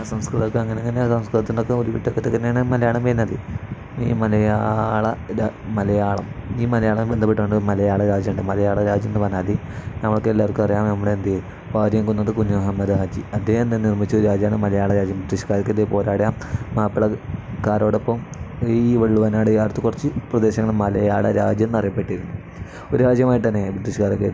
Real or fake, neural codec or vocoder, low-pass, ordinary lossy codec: real; none; none; none